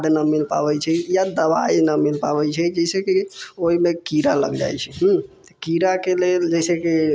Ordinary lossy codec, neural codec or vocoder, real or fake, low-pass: none; none; real; none